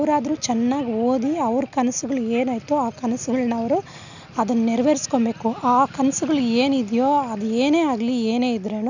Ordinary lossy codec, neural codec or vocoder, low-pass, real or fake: none; none; 7.2 kHz; real